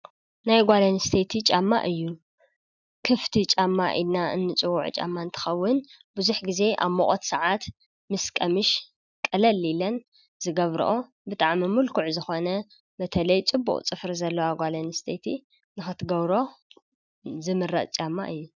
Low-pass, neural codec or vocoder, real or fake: 7.2 kHz; none; real